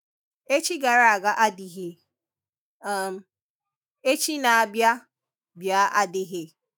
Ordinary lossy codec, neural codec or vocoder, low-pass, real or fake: none; autoencoder, 48 kHz, 128 numbers a frame, DAC-VAE, trained on Japanese speech; none; fake